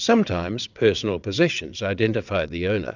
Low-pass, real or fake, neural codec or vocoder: 7.2 kHz; fake; vocoder, 22.05 kHz, 80 mel bands, WaveNeXt